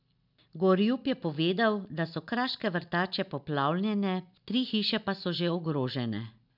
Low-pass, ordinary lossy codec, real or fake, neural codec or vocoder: 5.4 kHz; none; real; none